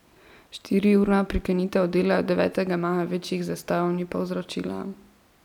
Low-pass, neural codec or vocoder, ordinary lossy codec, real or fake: 19.8 kHz; none; none; real